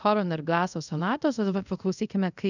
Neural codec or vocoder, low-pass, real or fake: codec, 24 kHz, 0.5 kbps, DualCodec; 7.2 kHz; fake